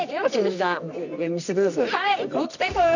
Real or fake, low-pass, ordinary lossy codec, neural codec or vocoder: fake; 7.2 kHz; none; codec, 24 kHz, 0.9 kbps, WavTokenizer, medium music audio release